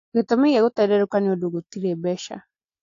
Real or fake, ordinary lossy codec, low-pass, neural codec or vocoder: real; AAC, 48 kbps; 7.2 kHz; none